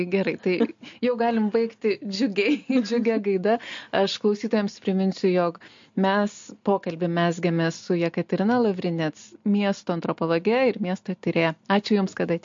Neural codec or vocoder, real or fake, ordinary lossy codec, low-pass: none; real; MP3, 48 kbps; 7.2 kHz